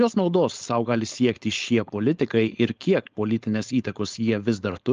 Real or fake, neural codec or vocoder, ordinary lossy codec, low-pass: fake; codec, 16 kHz, 4.8 kbps, FACodec; Opus, 24 kbps; 7.2 kHz